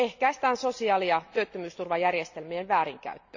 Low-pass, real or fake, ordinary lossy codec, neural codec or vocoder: 7.2 kHz; real; none; none